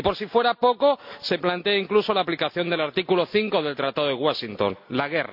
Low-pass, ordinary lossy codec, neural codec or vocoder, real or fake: 5.4 kHz; MP3, 48 kbps; none; real